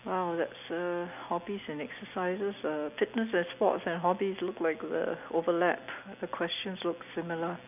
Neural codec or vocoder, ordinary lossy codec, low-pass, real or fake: none; none; 3.6 kHz; real